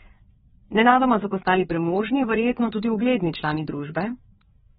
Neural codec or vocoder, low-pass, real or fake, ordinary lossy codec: codec, 16 kHz, 4 kbps, FreqCodec, larger model; 7.2 kHz; fake; AAC, 16 kbps